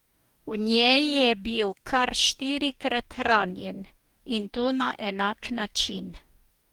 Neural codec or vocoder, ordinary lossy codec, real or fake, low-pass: codec, 44.1 kHz, 2.6 kbps, DAC; Opus, 32 kbps; fake; 19.8 kHz